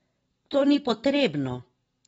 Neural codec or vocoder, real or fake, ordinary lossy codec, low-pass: none; real; AAC, 24 kbps; 19.8 kHz